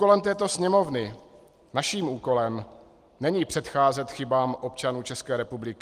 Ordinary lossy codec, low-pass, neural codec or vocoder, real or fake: Opus, 24 kbps; 14.4 kHz; none; real